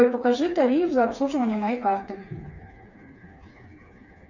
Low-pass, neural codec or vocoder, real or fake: 7.2 kHz; codec, 16 kHz, 4 kbps, FreqCodec, smaller model; fake